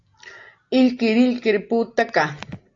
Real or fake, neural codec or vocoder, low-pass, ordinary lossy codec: real; none; 7.2 kHz; AAC, 48 kbps